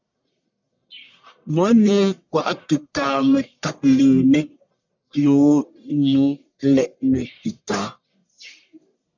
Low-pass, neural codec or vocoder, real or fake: 7.2 kHz; codec, 44.1 kHz, 1.7 kbps, Pupu-Codec; fake